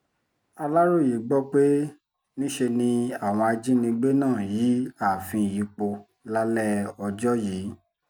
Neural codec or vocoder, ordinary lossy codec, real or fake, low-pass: none; none; real; none